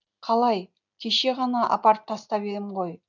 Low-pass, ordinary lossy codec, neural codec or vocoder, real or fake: 7.2 kHz; none; none; real